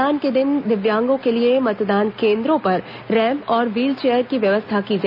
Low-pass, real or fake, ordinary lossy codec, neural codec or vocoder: 5.4 kHz; real; none; none